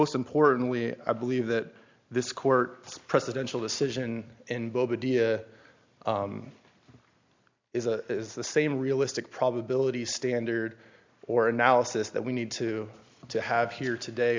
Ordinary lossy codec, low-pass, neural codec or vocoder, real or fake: MP3, 64 kbps; 7.2 kHz; none; real